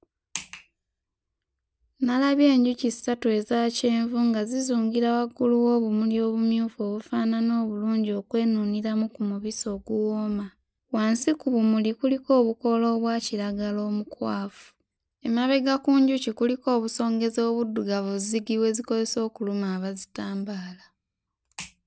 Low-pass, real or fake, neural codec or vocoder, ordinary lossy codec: none; real; none; none